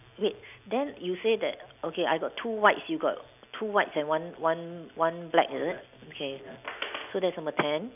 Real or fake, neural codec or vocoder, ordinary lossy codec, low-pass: real; none; none; 3.6 kHz